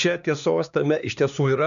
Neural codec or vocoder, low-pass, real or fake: codec, 16 kHz, 2 kbps, X-Codec, HuBERT features, trained on LibriSpeech; 7.2 kHz; fake